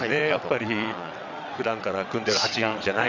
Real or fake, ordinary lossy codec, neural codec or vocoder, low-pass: fake; none; vocoder, 22.05 kHz, 80 mel bands, WaveNeXt; 7.2 kHz